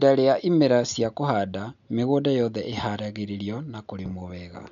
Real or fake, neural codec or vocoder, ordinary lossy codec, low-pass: real; none; none; 7.2 kHz